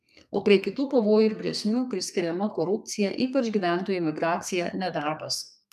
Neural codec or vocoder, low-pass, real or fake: codec, 32 kHz, 1.9 kbps, SNAC; 14.4 kHz; fake